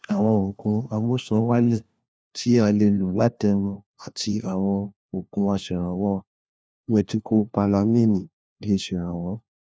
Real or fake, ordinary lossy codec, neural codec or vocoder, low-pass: fake; none; codec, 16 kHz, 1 kbps, FunCodec, trained on LibriTTS, 50 frames a second; none